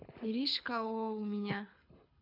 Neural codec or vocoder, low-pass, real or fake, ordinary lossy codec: codec, 24 kHz, 6 kbps, HILCodec; 5.4 kHz; fake; none